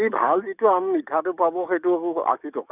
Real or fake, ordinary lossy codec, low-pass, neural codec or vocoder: fake; none; 3.6 kHz; codec, 44.1 kHz, 7.8 kbps, DAC